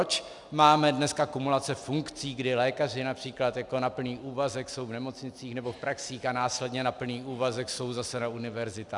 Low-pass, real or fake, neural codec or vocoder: 10.8 kHz; real; none